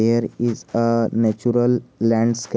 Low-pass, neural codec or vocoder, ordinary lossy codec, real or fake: none; none; none; real